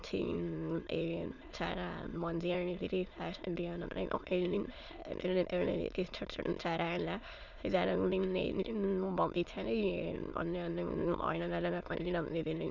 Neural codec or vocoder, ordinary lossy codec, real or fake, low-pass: autoencoder, 22.05 kHz, a latent of 192 numbers a frame, VITS, trained on many speakers; none; fake; 7.2 kHz